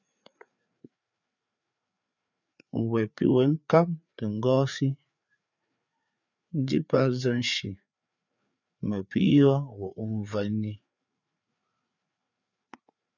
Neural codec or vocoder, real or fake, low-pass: codec, 16 kHz, 4 kbps, FreqCodec, larger model; fake; 7.2 kHz